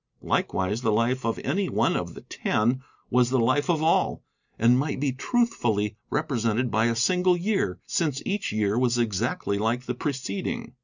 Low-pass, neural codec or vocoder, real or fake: 7.2 kHz; none; real